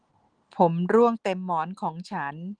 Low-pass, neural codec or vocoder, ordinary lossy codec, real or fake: 9.9 kHz; none; Opus, 16 kbps; real